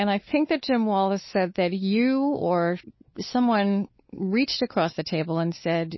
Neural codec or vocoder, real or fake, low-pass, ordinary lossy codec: codec, 16 kHz, 4 kbps, X-Codec, WavLM features, trained on Multilingual LibriSpeech; fake; 7.2 kHz; MP3, 24 kbps